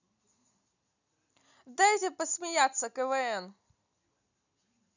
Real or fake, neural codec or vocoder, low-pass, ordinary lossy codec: real; none; 7.2 kHz; none